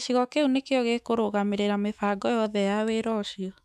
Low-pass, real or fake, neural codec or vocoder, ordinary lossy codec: 14.4 kHz; fake; autoencoder, 48 kHz, 128 numbers a frame, DAC-VAE, trained on Japanese speech; none